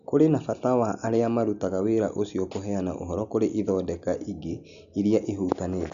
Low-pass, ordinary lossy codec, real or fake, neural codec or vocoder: 7.2 kHz; none; real; none